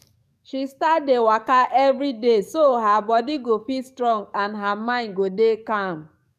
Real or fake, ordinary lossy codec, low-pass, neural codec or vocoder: fake; none; 14.4 kHz; codec, 44.1 kHz, 7.8 kbps, DAC